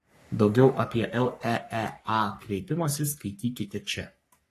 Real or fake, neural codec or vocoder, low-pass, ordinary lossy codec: fake; codec, 44.1 kHz, 3.4 kbps, Pupu-Codec; 14.4 kHz; AAC, 48 kbps